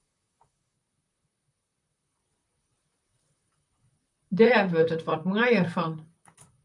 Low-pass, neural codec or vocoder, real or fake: 10.8 kHz; vocoder, 44.1 kHz, 128 mel bands, Pupu-Vocoder; fake